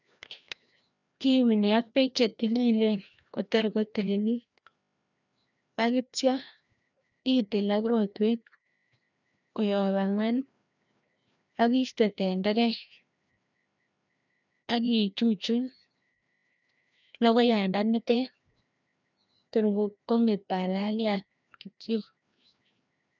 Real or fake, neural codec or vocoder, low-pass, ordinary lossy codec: fake; codec, 16 kHz, 1 kbps, FreqCodec, larger model; 7.2 kHz; none